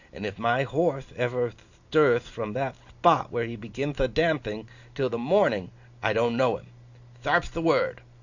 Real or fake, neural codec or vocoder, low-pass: real; none; 7.2 kHz